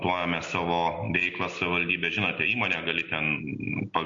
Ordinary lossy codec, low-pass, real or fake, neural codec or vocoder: MP3, 48 kbps; 7.2 kHz; real; none